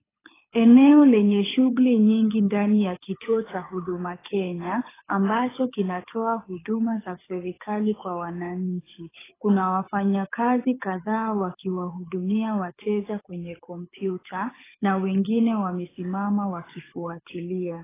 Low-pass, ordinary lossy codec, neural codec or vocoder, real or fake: 3.6 kHz; AAC, 16 kbps; codec, 24 kHz, 6 kbps, HILCodec; fake